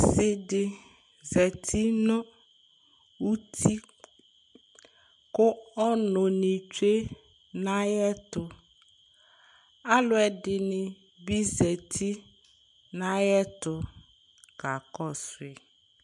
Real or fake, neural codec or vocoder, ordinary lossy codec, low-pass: real; none; MP3, 64 kbps; 10.8 kHz